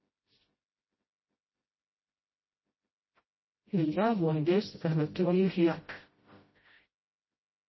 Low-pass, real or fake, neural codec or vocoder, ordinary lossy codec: 7.2 kHz; fake; codec, 16 kHz, 0.5 kbps, FreqCodec, smaller model; MP3, 24 kbps